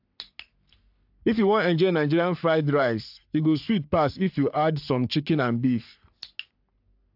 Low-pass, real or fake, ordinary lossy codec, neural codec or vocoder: 5.4 kHz; fake; AAC, 48 kbps; codec, 44.1 kHz, 3.4 kbps, Pupu-Codec